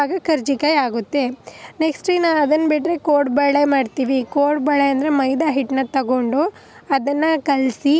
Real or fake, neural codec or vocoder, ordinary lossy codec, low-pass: real; none; none; none